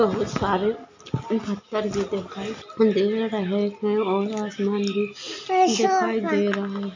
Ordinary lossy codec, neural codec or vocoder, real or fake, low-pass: MP3, 48 kbps; none; real; 7.2 kHz